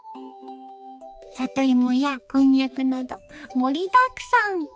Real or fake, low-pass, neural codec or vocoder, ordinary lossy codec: fake; none; codec, 16 kHz, 2 kbps, X-Codec, HuBERT features, trained on balanced general audio; none